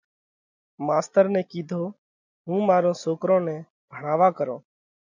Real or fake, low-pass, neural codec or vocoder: real; 7.2 kHz; none